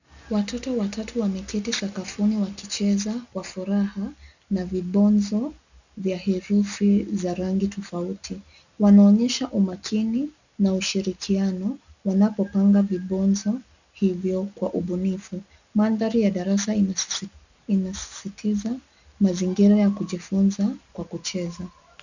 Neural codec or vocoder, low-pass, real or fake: none; 7.2 kHz; real